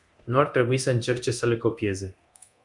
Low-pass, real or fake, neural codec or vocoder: 10.8 kHz; fake; codec, 24 kHz, 0.9 kbps, DualCodec